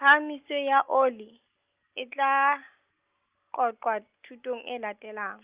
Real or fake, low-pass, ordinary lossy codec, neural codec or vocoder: real; 3.6 kHz; Opus, 32 kbps; none